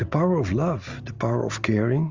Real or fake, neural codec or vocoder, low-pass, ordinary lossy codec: real; none; 7.2 kHz; Opus, 32 kbps